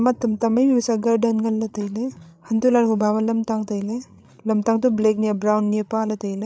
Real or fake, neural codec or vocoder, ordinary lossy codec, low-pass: fake; codec, 16 kHz, 16 kbps, FreqCodec, larger model; none; none